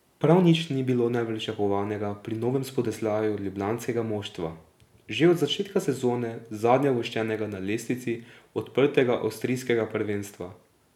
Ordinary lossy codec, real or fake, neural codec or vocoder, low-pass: none; real; none; 19.8 kHz